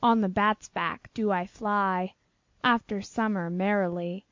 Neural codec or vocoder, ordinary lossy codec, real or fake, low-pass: none; MP3, 48 kbps; real; 7.2 kHz